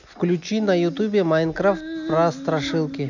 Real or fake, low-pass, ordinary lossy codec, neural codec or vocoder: real; 7.2 kHz; none; none